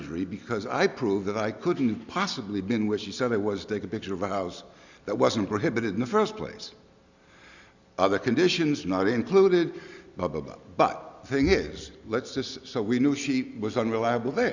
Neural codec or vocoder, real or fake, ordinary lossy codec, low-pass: none; real; Opus, 64 kbps; 7.2 kHz